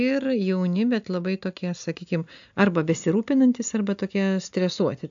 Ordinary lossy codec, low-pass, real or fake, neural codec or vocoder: AAC, 64 kbps; 7.2 kHz; real; none